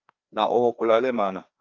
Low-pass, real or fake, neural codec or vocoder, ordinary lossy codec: 7.2 kHz; fake; codec, 16 kHz, 2 kbps, FreqCodec, larger model; Opus, 24 kbps